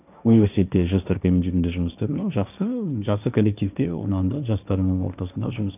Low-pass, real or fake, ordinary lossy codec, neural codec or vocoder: 3.6 kHz; fake; none; codec, 16 kHz, 1.1 kbps, Voila-Tokenizer